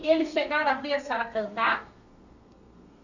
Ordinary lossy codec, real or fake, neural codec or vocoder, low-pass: none; fake; codec, 32 kHz, 1.9 kbps, SNAC; 7.2 kHz